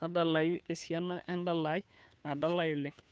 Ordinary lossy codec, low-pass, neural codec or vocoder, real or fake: none; none; codec, 16 kHz, 2 kbps, FunCodec, trained on Chinese and English, 25 frames a second; fake